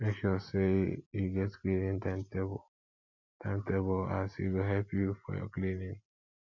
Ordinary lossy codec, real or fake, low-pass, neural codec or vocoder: MP3, 64 kbps; real; 7.2 kHz; none